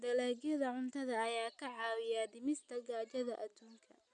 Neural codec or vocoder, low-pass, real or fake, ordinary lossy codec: none; 9.9 kHz; real; none